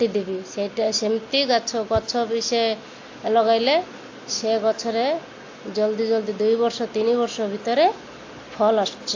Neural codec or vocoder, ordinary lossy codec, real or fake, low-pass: none; AAC, 48 kbps; real; 7.2 kHz